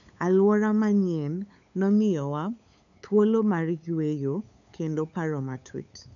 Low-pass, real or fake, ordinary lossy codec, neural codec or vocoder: 7.2 kHz; fake; none; codec, 16 kHz, 8 kbps, FunCodec, trained on LibriTTS, 25 frames a second